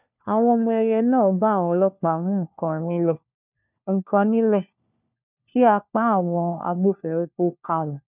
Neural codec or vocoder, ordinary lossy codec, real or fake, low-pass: codec, 16 kHz, 1 kbps, FunCodec, trained on LibriTTS, 50 frames a second; none; fake; 3.6 kHz